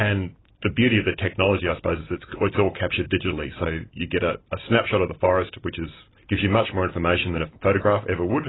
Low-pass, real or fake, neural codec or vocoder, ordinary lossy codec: 7.2 kHz; real; none; AAC, 16 kbps